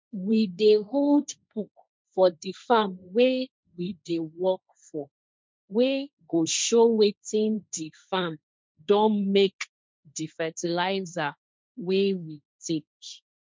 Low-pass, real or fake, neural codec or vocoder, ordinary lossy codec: none; fake; codec, 16 kHz, 1.1 kbps, Voila-Tokenizer; none